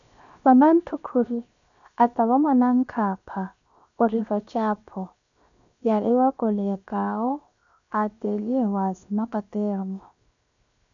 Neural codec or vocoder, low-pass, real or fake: codec, 16 kHz, 0.7 kbps, FocalCodec; 7.2 kHz; fake